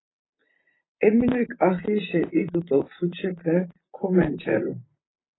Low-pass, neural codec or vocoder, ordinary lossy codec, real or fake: 7.2 kHz; vocoder, 44.1 kHz, 128 mel bands, Pupu-Vocoder; AAC, 16 kbps; fake